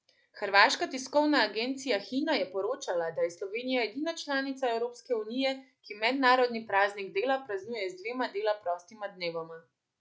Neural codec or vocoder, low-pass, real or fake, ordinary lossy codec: none; none; real; none